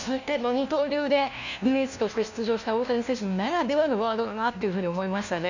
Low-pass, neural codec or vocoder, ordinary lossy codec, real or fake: 7.2 kHz; codec, 16 kHz, 1 kbps, FunCodec, trained on LibriTTS, 50 frames a second; none; fake